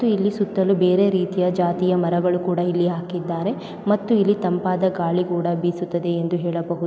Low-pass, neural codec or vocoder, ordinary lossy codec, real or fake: none; none; none; real